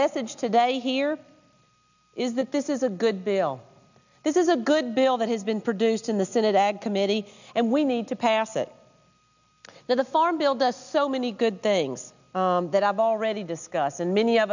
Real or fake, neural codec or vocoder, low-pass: real; none; 7.2 kHz